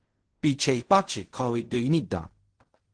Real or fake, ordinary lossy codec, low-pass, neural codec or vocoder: fake; Opus, 16 kbps; 9.9 kHz; codec, 16 kHz in and 24 kHz out, 0.4 kbps, LongCat-Audio-Codec, fine tuned four codebook decoder